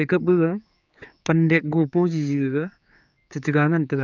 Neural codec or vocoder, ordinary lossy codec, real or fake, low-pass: codec, 16 kHz, 4 kbps, X-Codec, HuBERT features, trained on general audio; none; fake; 7.2 kHz